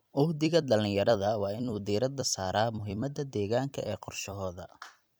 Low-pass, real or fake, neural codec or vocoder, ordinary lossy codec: none; fake; vocoder, 44.1 kHz, 128 mel bands every 256 samples, BigVGAN v2; none